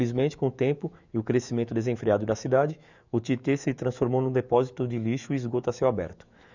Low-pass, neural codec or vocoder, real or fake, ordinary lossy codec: 7.2 kHz; autoencoder, 48 kHz, 128 numbers a frame, DAC-VAE, trained on Japanese speech; fake; none